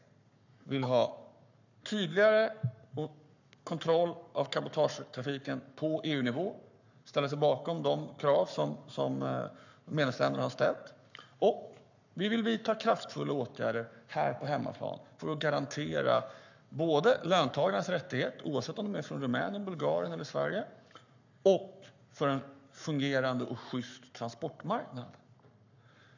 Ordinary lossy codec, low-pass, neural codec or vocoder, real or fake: none; 7.2 kHz; codec, 44.1 kHz, 7.8 kbps, Pupu-Codec; fake